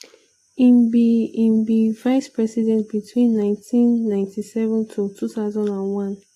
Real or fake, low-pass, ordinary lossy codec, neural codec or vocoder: real; 14.4 kHz; AAC, 48 kbps; none